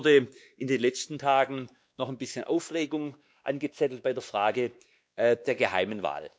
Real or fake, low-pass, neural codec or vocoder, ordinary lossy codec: fake; none; codec, 16 kHz, 2 kbps, X-Codec, WavLM features, trained on Multilingual LibriSpeech; none